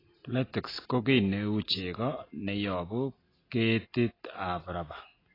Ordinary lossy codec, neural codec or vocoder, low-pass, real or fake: AAC, 24 kbps; none; 5.4 kHz; real